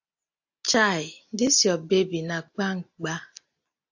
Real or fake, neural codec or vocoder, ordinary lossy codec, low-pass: real; none; AAC, 48 kbps; 7.2 kHz